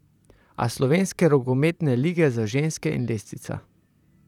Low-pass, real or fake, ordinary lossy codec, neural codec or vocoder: 19.8 kHz; fake; none; vocoder, 44.1 kHz, 128 mel bands, Pupu-Vocoder